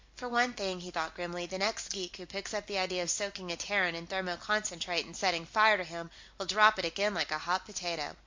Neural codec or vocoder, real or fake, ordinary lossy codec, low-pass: none; real; MP3, 48 kbps; 7.2 kHz